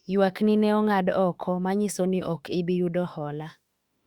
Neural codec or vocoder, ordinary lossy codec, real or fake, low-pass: autoencoder, 48 kHz, 32 numbers a frame, DAC-VAE, trained on Japanese speech; Opus, 64 kbps; fake; 19.8 kHz